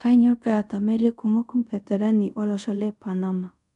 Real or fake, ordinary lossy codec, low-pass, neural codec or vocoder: fake; none; 10.8 kHz; codec, 24 kHz, 0.5 kbps, DualCodec